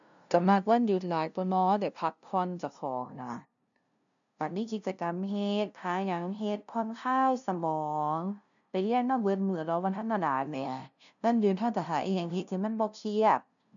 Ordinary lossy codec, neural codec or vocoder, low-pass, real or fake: none; codec, 16 kHz, 0.5 kbps, FunCodec, trained on LibriTTS, 25 frames a second; 7.2 kHz; fake